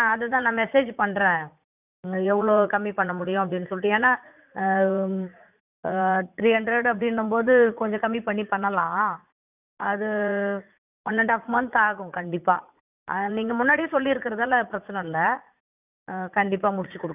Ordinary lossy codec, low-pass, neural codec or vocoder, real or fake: none; 3.6 kHz; vocoder, 44.1 kHz, 80 mel bands, Vocos; fake